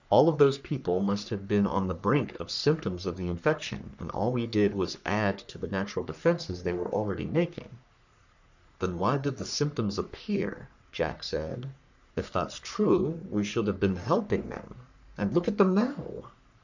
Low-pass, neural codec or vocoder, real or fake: 7.2 kHz; codec, 44.1 kHz, 3.4 kbps, Pupu-Codec; fake